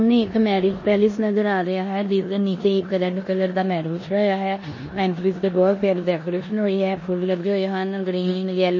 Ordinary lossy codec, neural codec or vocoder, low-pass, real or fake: MP3, 32 kbps; codec, 16 kHz in and 24 kHz out, 0.9 kbps, LongCat-Audio-Codec, four codebook decoder; 7.2 kHz; fake